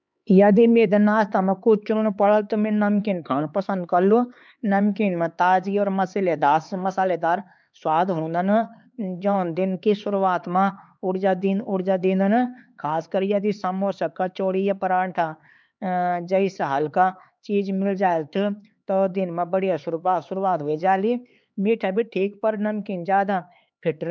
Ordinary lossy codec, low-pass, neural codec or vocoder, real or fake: none; none; codec, 16 kHz, 4 kbps, X-Codec, HuBERT features, trained on LibriSpeech; fake